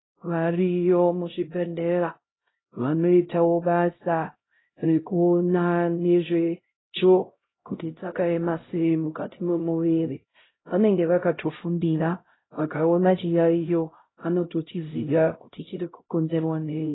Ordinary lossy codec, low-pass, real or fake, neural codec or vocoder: AAC, 16 kbps; 7.2 kHz; fake; codec, 16 kHz, 0.5 kbps, X-Codec, HuBERT features, trained on LibriSpeech